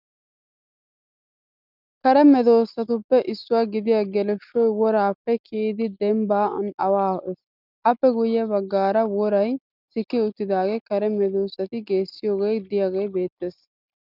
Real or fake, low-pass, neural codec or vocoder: real; 5.4 kHz; none